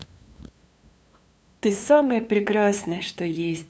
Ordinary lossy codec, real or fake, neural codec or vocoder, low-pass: none; fake; codec, 16 kHz, 2 kbps, FunCodec, trained on LibriTTS, 25 frames a second; none